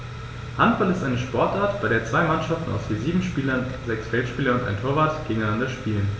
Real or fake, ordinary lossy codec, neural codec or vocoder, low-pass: real; none; none; none